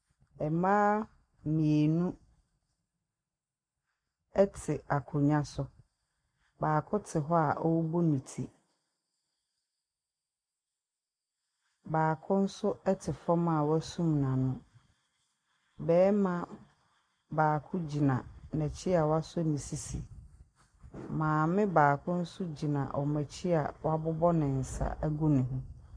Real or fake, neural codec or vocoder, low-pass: real; none; 9.9 kHz